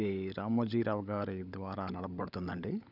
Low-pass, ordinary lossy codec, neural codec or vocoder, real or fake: 5.4 kHz; none; codec, 16 kHz, 16 kbps, FunCodec, trained on LibriTTS, 50 frames a second; fake